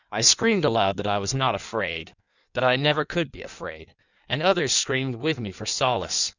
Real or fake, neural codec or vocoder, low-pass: fake; codec, 16 kHz in and 24 kHz out, 1.1 kbps, FireRedTTS-2 codec; 7.2 kHz